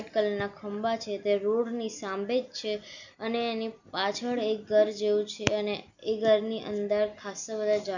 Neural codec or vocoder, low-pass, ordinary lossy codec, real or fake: none; 7.2 kHz; none; real